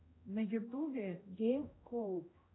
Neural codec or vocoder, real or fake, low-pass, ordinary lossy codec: codec, 16 kHz, 0.5 kbps, X-Codec, HuBERT features, trained on general audio; fake; 7.2 kHz; AAC, 16 kbps